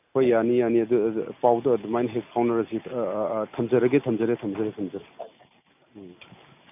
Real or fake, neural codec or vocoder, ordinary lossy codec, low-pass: real; none; none; 3.6 kHz